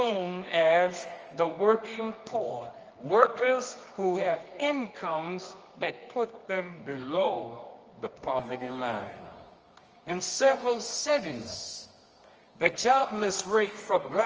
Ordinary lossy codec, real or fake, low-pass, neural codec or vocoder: Opus, 24 kbps; fake; 7.2 kHz; codec, 24 kHz, 0.9 kbps, WavTokenizer, medium music audio release